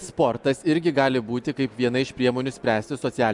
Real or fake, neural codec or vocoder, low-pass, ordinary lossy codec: real; none; 10.8 kHz; MP3, 96 kbps